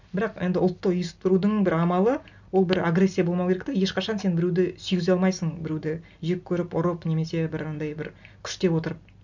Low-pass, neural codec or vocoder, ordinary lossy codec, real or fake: 7.2 kHz; none; MP3, 64 kbps; real